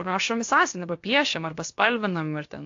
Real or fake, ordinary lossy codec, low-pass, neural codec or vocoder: fake; AAC, 48 kbps; 7.2 kHz; codec, 16 kHz, about 1 kbps, DyCAST, with the encoder's durations